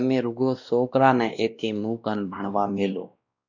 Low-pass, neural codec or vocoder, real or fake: 7.2 kHz; codec, 16 kHz, 1 kbps, X-Codec, WavLM features, trained on Multilingual LibriSpeech; fake